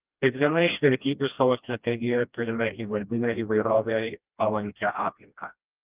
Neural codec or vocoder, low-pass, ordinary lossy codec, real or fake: codec, 16 kHz, 1 kbps, FreqCodec, smaller model; 3.6 kHz; Opus, 32 kbps; fake